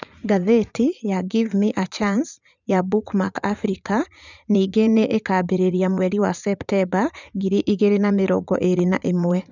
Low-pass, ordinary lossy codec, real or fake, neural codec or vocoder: 7.2 kHz; none; fake; vocoder, 44.1 kHz, 80 mel bands, Vocos